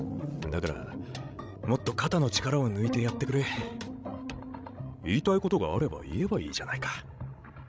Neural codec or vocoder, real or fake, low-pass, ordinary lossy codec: codec, 16 kHz, 16 kbps, FreqCodec, larger model; fake; none; none